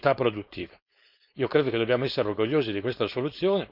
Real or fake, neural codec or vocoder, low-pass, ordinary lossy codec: fake; codec, 16 kHz, 4.8 kbps, FACodec; 5.4 kHz; none